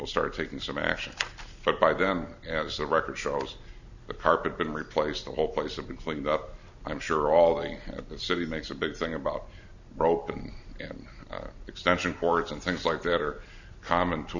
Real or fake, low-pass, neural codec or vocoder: real; 7.2 kHz; none